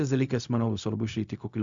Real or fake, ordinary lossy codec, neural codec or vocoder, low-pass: fake; Opus, 64 kbps; codec, 16 kHz, 0.4 kbps, LongCat-Audio-Codec; 7.2 kHz